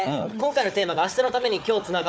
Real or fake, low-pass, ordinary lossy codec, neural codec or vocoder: fake; none; none; codec, 16 kHz, 4 kbps, FunCodec, trained on Chinese and English, 50 frames a second